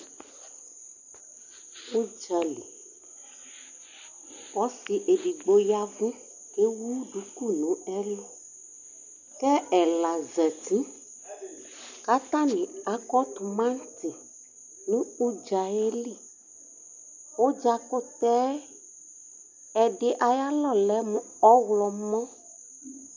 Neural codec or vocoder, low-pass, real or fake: none; 7.2 kHz; real